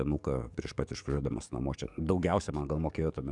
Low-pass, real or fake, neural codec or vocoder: 10.8 kHz; fake; codec, 44.1 kHz, 7.8 kbps, DAC